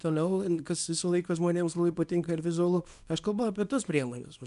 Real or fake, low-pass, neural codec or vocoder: fake; 10.8 kHz; codec, 24 kHz, 0.9 kbps, WavTokenizer, small release